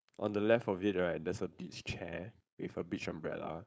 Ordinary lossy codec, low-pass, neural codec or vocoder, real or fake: none; none; codec, 16 kHz, 4.8 kbps, FACodec; fake